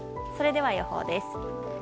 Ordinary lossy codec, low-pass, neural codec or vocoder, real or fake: none; none; none; real